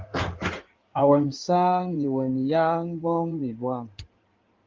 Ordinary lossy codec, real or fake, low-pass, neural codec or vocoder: Opus, 32 kbps; fake; 7.2 kHz; codec, 16 kHz in and 24 kHz out, 2.2 kbps, FireRedTTS-2 codec